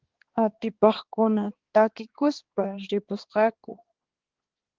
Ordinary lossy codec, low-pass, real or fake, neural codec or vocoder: Opus, 16 kbps; 7.2 kHz; fake; codec, 16 kHz, 2 kbps, X-Codec, HuBERT features, trained on balanced general audio